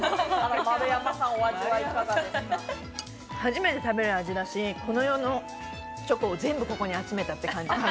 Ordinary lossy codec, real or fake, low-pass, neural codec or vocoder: none; real; none; none